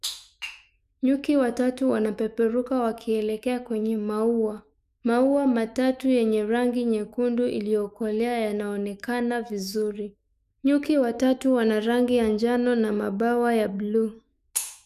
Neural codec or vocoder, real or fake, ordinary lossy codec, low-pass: autoencoder, 48 kHz, 128 numbers a frame, DAC-VAE, trained on Japanese speech; fake; none; 14.4 kHz